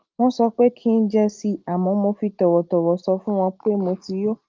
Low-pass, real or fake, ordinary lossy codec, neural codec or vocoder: 7.2 kHz; real; Opus, 32 kbps; none